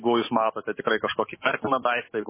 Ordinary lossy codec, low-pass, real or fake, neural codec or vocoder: MP3, 16 kbps; 3.6 kHz; fake; autoencoder, 48 kHz, 128 numbers a frame, DAC-VAE, trained on Japanese speech